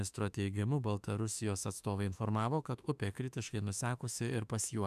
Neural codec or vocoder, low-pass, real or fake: autoencoder, 48 kHz, 32 numbers a frame, DAC-VAE, trained on Japanese speech; 14.4 kHz; fake